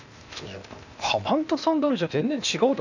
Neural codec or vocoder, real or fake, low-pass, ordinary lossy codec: codec, 16 kHz, 0.8 kbps, ZipCodec; fake; 7.2 kHz; none